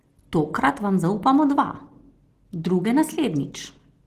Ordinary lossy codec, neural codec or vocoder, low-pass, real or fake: Opus, 16 kbps; none; 14.4 kHz; real